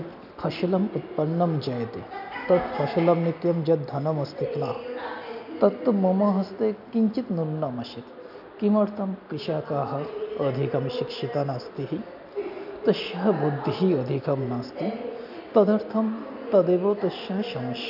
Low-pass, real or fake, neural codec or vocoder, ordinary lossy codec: 5.4 kHz; real; none; none